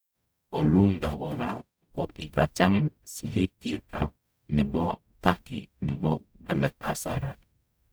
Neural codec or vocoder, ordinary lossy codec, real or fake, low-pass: codec, 44.1 kHz, 0.9 kbps, DAC; none; fake; none